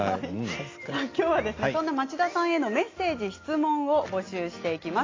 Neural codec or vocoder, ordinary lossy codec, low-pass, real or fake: none; AAC, 32 kbps; 7.2 kHz; real